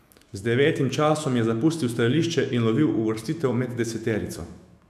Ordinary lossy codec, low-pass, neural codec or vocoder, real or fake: AAC, 96 kbps; 14.4 kHz; autoencoder, 48 kHz, 128 numbers a frame, DAC-VAE, trained on Japanese speech; fake